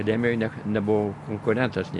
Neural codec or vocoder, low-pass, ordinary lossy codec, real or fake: none; 10.8 kHz; AAC, 64 kbps; real